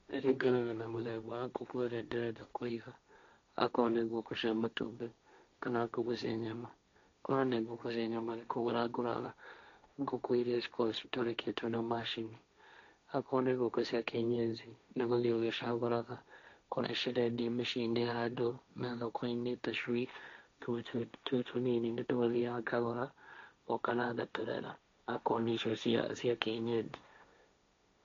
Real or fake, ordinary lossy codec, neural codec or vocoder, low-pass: fake; MP3, 48 kbps; codec, 16 kHz, 1.1 kbps, Voila-Tokenizer; 7.2 kHz